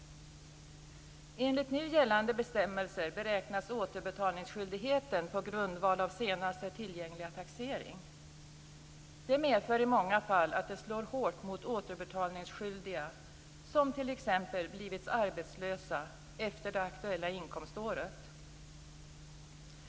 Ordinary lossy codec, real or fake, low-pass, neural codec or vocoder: none; real; none; none